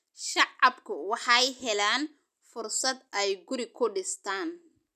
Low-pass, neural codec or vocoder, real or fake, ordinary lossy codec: 14.4 kHz; none; real; none